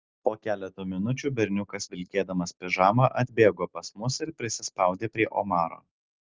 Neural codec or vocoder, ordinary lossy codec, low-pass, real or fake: none; Opus, 32 kbps; 7.2 kHz; real